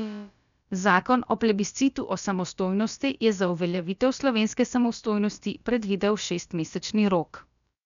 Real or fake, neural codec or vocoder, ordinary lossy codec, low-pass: fake; codec, 16 kHz, about 1 kbps, DyCAST, with the encoder's durations; none; 7.2 kHz